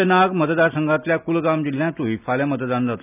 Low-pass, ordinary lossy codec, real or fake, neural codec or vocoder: 3.6 kHz; none; real; none